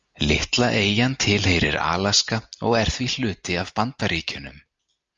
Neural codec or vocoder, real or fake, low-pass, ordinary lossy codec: none; real; 7.2 kHz; Opus, 32 kbps